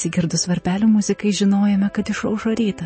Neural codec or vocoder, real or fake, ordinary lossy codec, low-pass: none; real; MP3, 32 kbps; 9.9 kHz